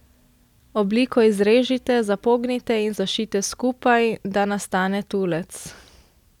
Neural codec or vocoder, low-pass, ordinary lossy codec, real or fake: none; 19.8 kHz; none; real